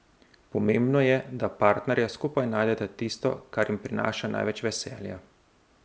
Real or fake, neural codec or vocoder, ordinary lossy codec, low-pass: real; none; none; none